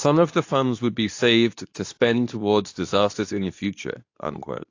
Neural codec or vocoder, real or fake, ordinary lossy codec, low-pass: codec, 24 kHz, 0.9 kbps, WavTokenizer, medium speech release version 2; fake; AAC, 48 kbps; 7.2 kHz